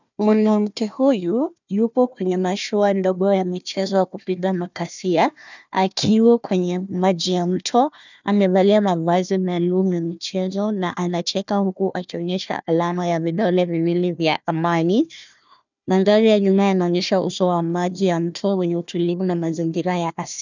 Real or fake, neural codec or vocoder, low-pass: fake; codec, 16 kHz, 1 kbps, FunCodec, trained on Chinese and English, 50 frames a second; 7.2 kHz